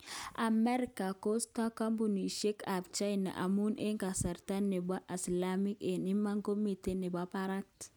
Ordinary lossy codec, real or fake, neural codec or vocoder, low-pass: none; real; none; none